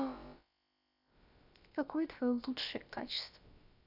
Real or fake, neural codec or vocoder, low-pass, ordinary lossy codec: fake; codec, 16 kHz, about 1 kbps, DyCAST, with the encoder's durations; 5.4 kHz; none